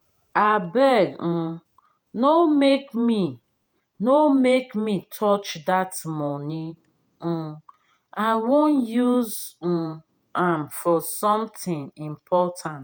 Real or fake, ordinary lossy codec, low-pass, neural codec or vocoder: fake; none; none; vocoder, 48 kHz, 128 mel bands, Vocos